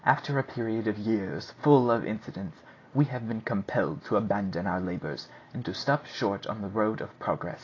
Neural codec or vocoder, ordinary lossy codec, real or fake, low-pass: none; AAC, 32 kbps; real; 7.2 kHz